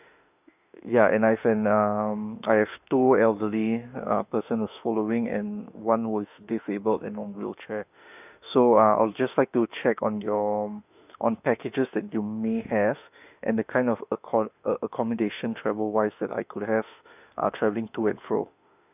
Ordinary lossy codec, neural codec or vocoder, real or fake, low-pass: none; autoencoder, 48 kHz, 32 numbers a frame, DAC-VAE, trained on Japanese speech; fake; 3.6 kHz